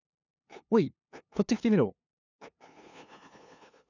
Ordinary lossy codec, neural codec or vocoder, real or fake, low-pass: none; codec, 16 kHz, 2 kbps, FunCodec, trained on LibriTTS, 25 frames a second; fake; 7.2 kHz